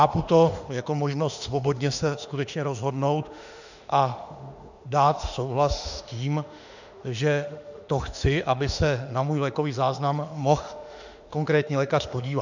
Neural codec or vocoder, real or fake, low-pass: autoencoder, 48 kHz, 32 numbers a frame, DAC-VAE, trained on Japanese speech; fake; 7.2 kHz